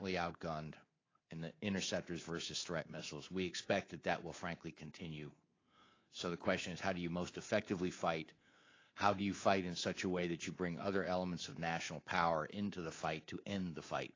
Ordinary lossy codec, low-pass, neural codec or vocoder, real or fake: AAC, 32 kbps; 7.2 kHz; codec, 24 kHz, 3.1 kbps, DualCodec; fake